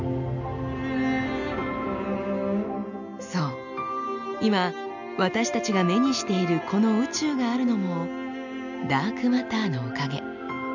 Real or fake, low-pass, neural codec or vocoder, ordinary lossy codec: real; 7.2 kHz; none; none